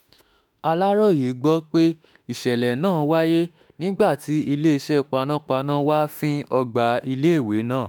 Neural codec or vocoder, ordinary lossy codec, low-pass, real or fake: autoencoder, 48 kHz, 32 numbers a frame, DAC-VAE, trained on Japanese speech; none; none; fake